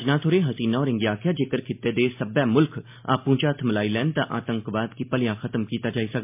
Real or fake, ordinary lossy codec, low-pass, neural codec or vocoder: real; MP3, 32 kbps; 3.6 kHz; none